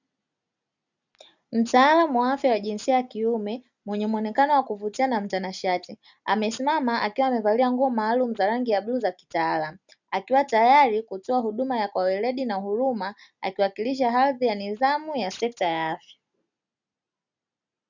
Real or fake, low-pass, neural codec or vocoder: real; 7.2 kHz; none